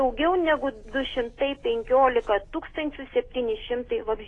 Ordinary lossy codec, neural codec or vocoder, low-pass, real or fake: AAC, 32 kbps; none; 10.8 kHz; real